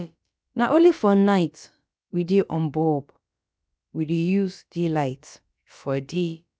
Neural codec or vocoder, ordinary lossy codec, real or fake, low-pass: codec, 16 kHz, about 1 kbps, DyCAST, with the encoder's durations; none; fake; none